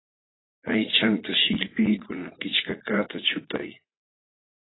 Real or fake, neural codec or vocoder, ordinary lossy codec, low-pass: fake; vocoder, 44.1 kHz, 80 mel bands, Vocos; AAC, 16 kbps; 7.2 kHz